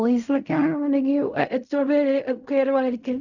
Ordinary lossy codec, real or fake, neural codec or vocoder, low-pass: none; fake; codec, 16 kHz in and 24 kHz out, 0.4 kbps, LongCat-Audio-Codec, fine tuned four codebook decoder; 7.2 kHz